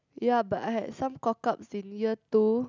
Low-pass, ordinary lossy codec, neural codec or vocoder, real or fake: 7.2 kHz; none; none; real